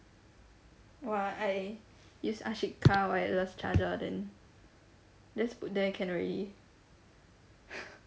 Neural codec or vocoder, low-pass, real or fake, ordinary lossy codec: none; none; real; none